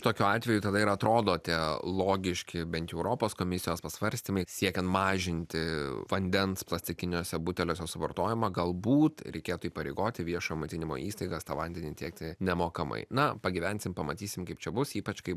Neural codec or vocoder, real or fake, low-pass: none; real; 14.4 kHz